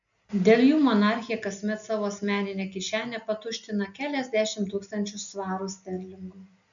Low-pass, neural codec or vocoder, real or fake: 7.2 kHz; none; real